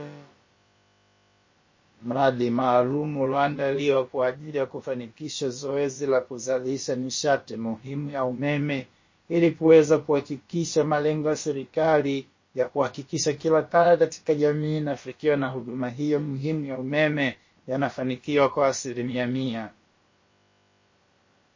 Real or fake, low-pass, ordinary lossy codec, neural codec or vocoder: fake; 7.2 kHz; MP3, 32 kbps; codec, 16 kHz, about 1 kbps, DyCAST, with the encoder's durations